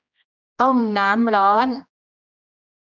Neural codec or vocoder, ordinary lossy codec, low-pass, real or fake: codec, 16 kHz, 1 kbps, X-Codec, HuBERT features, trained on general audio; none; 7.2 kHz; fake